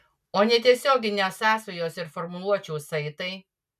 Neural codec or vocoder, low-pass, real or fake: none; 14.4 kHz; real